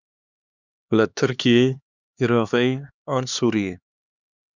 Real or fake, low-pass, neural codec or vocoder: fake; 7.2 kHz; codec, 16 kHz, 2 kbps, X-Codec, HuBERT features, trained on LibriSpeech